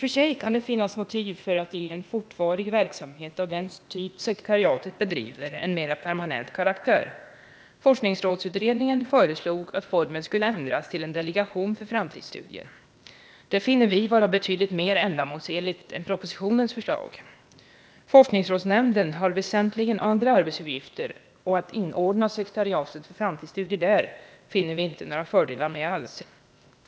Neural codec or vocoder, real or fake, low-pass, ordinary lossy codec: codec, 16 kHz, 0.8 kbps, ZipCodec; fake; none; none